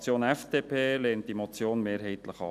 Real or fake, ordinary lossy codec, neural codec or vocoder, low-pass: real; none; none; 14.4 kHz